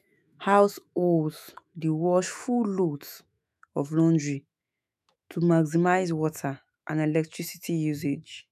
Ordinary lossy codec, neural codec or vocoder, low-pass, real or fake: none; autoencoder, 48 kHz, 128 numbers a frame, DAC-VAE, trained on Japanese speech; 14.4 kHz; fake